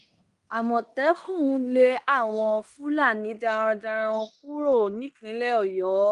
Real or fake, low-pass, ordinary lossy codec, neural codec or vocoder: fake; 10.8 kHz; Opus, 32 kbps; codec, 16 kHz in and 24 kHz out, 0.9 kbps, LongCat-Audio-Codec, fine tuned four codebook decoder